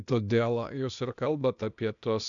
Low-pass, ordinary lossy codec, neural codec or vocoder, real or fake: 7.2 kHz; MP3, 96 kbps; codec, 16 kHz, 0.8 kbps, ZipCodec; fake